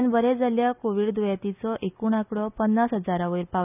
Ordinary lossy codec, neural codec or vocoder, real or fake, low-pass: none; none; real; 3.6 kHz